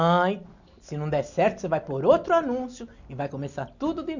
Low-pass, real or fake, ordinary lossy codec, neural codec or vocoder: 7.2 kHz; real; none; none